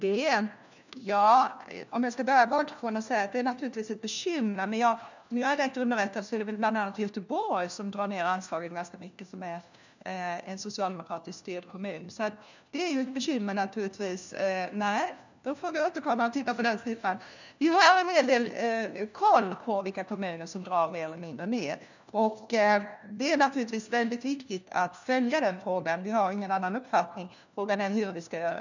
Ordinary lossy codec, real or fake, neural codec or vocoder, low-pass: none; fake; codec, 16 kHz, 1 kbps, FunCodec, trained on LibriTTS, 50 frames a second; 7.2 kHz